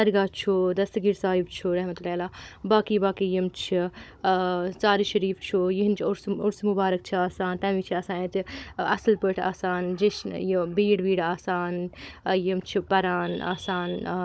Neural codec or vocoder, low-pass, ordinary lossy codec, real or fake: codec, 16 kHz, 16 kbps, FunCodec, trained on Chinese and English, 50 frames a second; none; none; fake